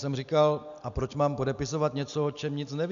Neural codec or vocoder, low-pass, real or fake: none; 7.2 kHz; real